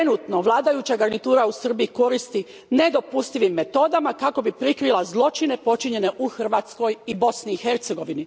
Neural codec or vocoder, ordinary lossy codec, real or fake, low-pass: none; none; real; none